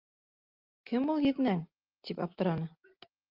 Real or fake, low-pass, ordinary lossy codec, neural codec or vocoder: real; 5.4 kHz; Opus, 32 kbps; none